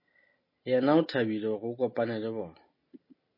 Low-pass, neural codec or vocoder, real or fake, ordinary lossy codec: 5.4 kHz; none; real; MP3, 24 kbps